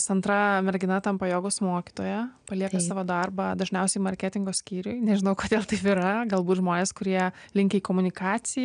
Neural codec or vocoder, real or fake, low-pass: none; real; 9.9 kHz